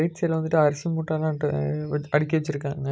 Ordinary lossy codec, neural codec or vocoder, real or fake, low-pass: none; none; real; none